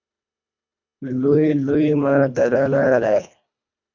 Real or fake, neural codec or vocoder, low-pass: fake; codec, 24 kHz, 1.5 kbps, HILCodec; 7.2 kHz